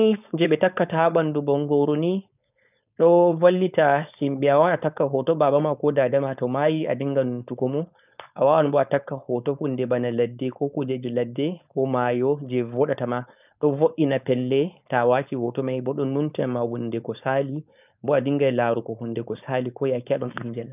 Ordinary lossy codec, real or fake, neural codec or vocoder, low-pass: none; fake; codec, 16 kHz, 4.8 kbps, FACodec; 3.6 kHz